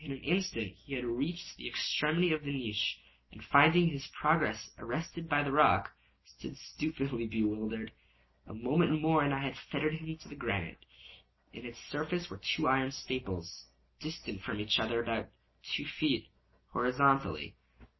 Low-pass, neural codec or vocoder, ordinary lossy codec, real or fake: 7.2 kHz; none; MP3, 24 kbps; real